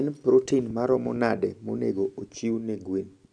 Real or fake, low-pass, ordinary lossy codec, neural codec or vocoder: real; 9.9 kHz; none; none